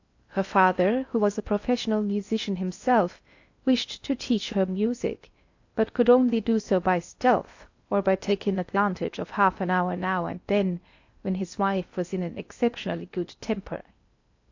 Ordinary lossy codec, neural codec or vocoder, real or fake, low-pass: AAC, 48 kbps; codec, 16 kHz in and 24 kHz out, 0.6 kbps, FocalCodec, streaming, 4096 codes; fake; 7.2 kHz